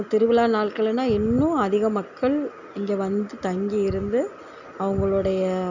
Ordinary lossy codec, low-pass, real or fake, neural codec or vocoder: none; 7.2 kHz; real; none